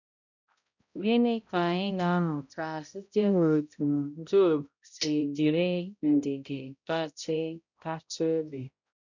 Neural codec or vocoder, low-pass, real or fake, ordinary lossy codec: codec, 16 kHz, 0.5 kbps, X-Codec, HuBERT features, trained on balanced general audio; 7.2 kHz; fake; none